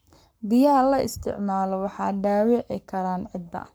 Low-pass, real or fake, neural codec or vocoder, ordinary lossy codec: none; fake; codec, 44.1 kHz, 7.8 kbps, Pupu-Codec; none